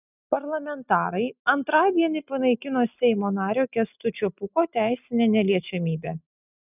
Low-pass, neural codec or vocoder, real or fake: 3.6 kHz; none; real